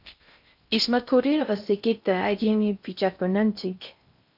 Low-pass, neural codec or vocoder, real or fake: 5.4 kHz; codec, 16 kHz in and 24 kHz out, 0.6 kbps, FocalCodec, streaming, 4096 codes; fake